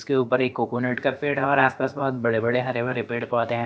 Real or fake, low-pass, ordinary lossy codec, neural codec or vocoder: fake; none; none; codec, 16 kHz, about 1 kbps, DyCAST, with the encoder's durations